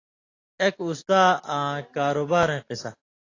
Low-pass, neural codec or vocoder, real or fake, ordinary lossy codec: 7.2 kHz; none; real; AAC, 32 kbps